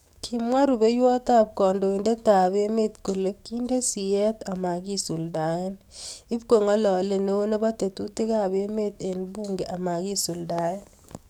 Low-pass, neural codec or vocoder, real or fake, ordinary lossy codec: 19.8 kHz; codec, 44.1 kHz, 7.8 kbps, DAC; fake; none